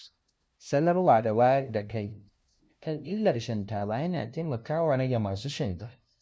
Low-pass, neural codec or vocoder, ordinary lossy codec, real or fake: none; codec, 16 kHz, 0.5 kbps, FunCodec, trained on LibriTTS, 25 frames a second; none; fake